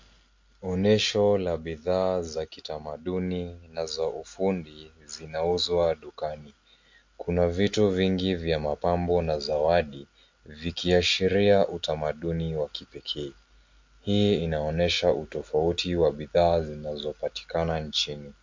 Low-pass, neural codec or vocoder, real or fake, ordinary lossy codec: 7.2 kHz; none; real; MP3, 48 kbps